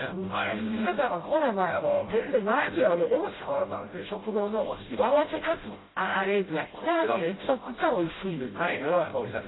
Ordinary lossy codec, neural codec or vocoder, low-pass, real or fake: AAC, 16 kbps; codec, 16 kHz, 0.5 kbps, FreqCodec, smaller model; 7.2 kHz; fake